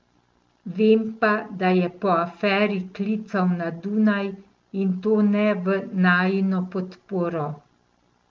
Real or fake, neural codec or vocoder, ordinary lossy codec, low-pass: real; none; Opus, 32 kbps; 7.2 kHz